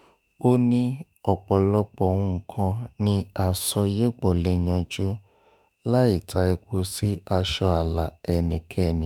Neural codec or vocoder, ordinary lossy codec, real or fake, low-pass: autoencoder, 48 kHz, 32 numbers a frame, DAC-VAE, trained on Japanese speech; none; fake; none